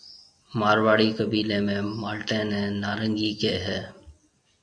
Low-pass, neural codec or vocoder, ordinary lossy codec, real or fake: 9.9 kHz; none; AAC, 64 kbps; real